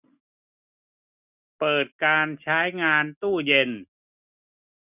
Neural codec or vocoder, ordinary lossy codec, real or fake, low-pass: none; none; real; 3.6 kHz